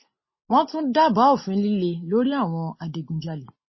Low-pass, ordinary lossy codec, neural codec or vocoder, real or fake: 7.2 kHz; MP3, 24 kbps; none; real